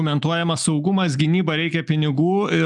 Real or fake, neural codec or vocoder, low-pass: real; none; 10.8 kHz